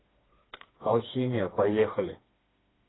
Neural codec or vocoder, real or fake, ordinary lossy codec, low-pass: codec, 16 kHz, 2 kbps, FreqCodec, smaller model; fake; AAC, 16 kbps; 7.2 kHz